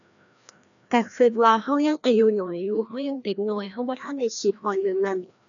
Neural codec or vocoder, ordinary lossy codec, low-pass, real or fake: codec, 16 kHz, 1 kbps, FreqCodec, larger model; none; 7.2 kHz; fake